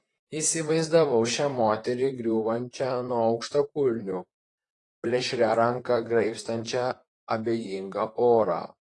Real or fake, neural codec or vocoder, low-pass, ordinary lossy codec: fake; vocoder, 44.1 kHz, 128 mel bands, Pupu-Vocoder; 10.8 kHz; AAC, 32 kbps